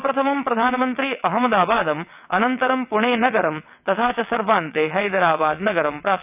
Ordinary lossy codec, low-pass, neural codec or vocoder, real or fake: none; 3.6 kHz; vocoder, 22.05 kHz, 80 mel bands, WaveNeXt; fake